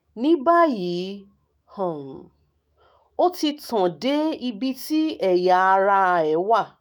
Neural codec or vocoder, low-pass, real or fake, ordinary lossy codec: autoencoder, 48 kHz, 128 numbers a frame, DAC-VAE, trained on Japanese speech; none; fake; none